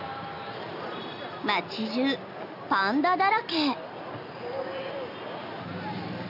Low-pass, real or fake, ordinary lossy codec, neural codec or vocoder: 5.4 kHz; real; AAC, 48 kbps; none